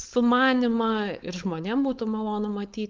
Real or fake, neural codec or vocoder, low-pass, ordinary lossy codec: fake; codec, 16 kHz, 4.8 kbps, FACodec; 7.2 kHz; Opus, 32 kbps